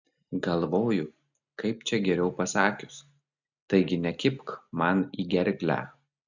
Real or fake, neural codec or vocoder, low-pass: real; none; 7.2 kHz